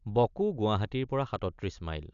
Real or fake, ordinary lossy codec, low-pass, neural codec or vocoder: real; none; 7.2 kHz; none